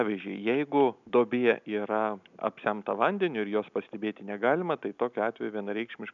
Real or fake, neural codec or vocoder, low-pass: real; none; 7.2 kHz